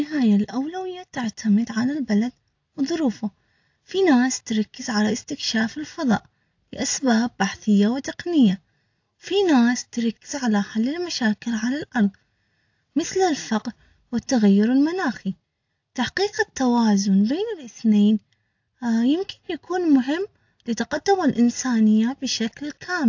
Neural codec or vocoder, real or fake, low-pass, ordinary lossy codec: none; real; 7.2 kHz; AAC, 48 kbps